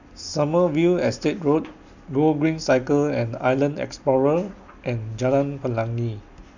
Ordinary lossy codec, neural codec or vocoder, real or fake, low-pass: none; none; real; 7.2 kHz